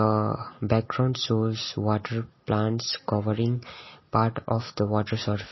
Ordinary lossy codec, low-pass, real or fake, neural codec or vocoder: MP3, 24 kbps; 7.2 kHz; real; none